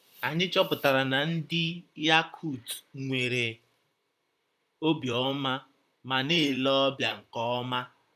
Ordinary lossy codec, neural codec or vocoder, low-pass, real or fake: none; vocoder, 44.1 kHz, 128 mel bands, Pupu-Vocoder; 14.4 kHz; fake